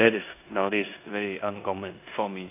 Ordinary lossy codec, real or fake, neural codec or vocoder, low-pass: AAC, 24 kbps; fake; codec, 16 kHz in and 24 kHz out, 0.9 kbps, LongCat-Audio-Codec, four codebook decoder; 3.6 kHz